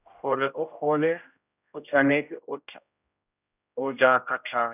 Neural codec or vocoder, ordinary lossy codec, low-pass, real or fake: codec, 16 kHz, 0.5 kbps, X-Codec, HuBERT features, trained on general audio; none; 3.6 kHz; fake